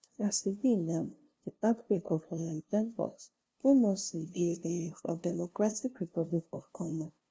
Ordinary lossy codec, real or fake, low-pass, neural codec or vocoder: none; fake; none; codec, 16 kHz, 0.5 kbps, FunCodec, trained on LibriTTS, 25 frames a second